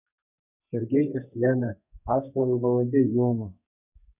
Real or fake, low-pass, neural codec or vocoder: fake; 3.6 kHz; codec, 32 kHz, 1.9 kbps, SNAC